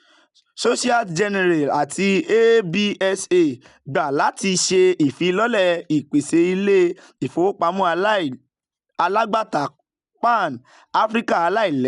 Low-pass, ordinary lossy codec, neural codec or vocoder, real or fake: 10.8 kHz; none; none; real